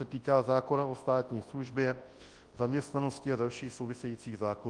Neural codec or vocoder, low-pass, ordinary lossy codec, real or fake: codec, 24 kHz, 0.9 kbps, WavTokenizer, large speech release; 10.8 kHz; Opus, 24 kbps; fake